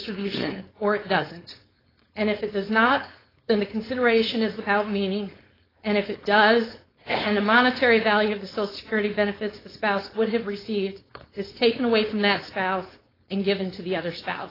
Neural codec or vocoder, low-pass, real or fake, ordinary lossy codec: codec, 16 kHz, 4.8 kbps, FACodec; 5.4 kHz; fake; AAC, 24 kbps